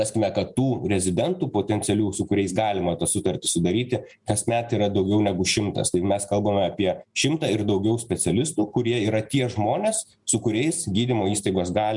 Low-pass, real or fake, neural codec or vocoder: 10.8 kHz; real; none